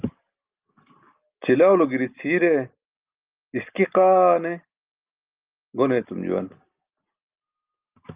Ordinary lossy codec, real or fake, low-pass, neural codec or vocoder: Opus, 24 kbps; real; 3.6 kHz; none